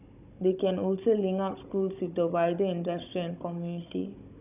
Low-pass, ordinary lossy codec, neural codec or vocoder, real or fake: 3.6 kHz; none; codec, 16 kHz, 16 kbps, FunCodec, trained on Chinese and English, 50 frames a second; fake